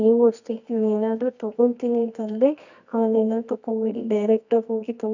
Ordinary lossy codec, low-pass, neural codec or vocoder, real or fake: none; 7.2 kHz; codec, 24 kHz, 0.9 kbps, WavTokenizer, medium music audio release; fake